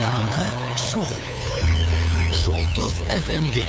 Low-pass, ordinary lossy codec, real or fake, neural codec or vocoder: none; none; fake; codec, 16 kHz, 2 kbps, FunCodec, trained on LibriTTS, 25 frames a second